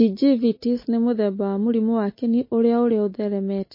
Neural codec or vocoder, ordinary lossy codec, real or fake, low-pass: none; MP3, 24 kbps; real; 5.4 kHz